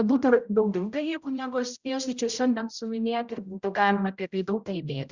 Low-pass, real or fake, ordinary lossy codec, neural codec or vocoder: 7.2 kHz; fake; Opus, 64 kbps; codec, 16 kHz, 0.5 kbps, X-Codec, HuBERT features, trained on general audio